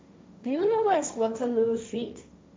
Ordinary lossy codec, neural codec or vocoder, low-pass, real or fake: none; codec, 16 kHz, 1.1 kbps, Voila-Tokenizer; none; fake